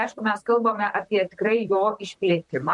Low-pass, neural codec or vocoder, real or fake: 10.8 kHz; vocoder, 44.1 kHz, 128 mel bands, Pupu-Vocoder; fake